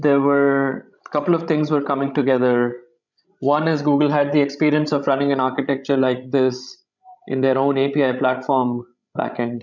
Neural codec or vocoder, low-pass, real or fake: codec, 16 kHz, 16 kbps, FreqCodec, larger model; 7.2 kHz; fake